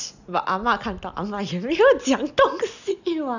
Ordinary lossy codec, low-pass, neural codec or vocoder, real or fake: none; 7.2 kHz; none; real